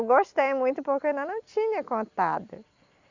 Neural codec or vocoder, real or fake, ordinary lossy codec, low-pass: none; real; Opus, 64 kbps; 7.2 kHz